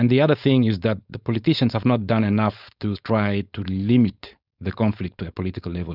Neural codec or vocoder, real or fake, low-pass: codec, 16 kHz, 4.8 kbps, FACodec; fake; 5.4 kHz